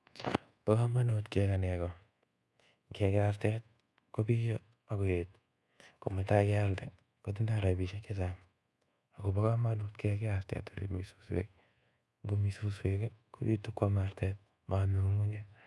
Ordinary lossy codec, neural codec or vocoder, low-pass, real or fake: none; codec, 24 kHz, 1.2 kbps, DualCodec; none; fake